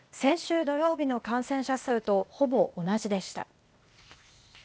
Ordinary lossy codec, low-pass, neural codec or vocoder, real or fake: none; none; codec, 16 kHz, 0.8 kbps, ZipCodec; fake